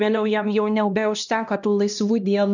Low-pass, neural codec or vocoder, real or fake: 7.2 kHz; codec, 16 kHz, 1 kbps, X-Codec, HuBERT features, trained on LibriSpeech; fake